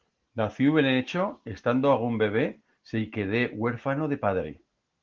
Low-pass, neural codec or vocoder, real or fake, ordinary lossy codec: 7.2 kHz; none; real; Opus, 16 kbps